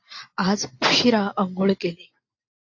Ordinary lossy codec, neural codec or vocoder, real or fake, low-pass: AAC, 48 kbps; none; real; 7.2 kHz